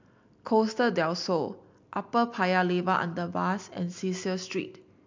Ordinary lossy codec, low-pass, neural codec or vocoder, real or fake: MP3, 64 kbps; 7.2 kHz; none; real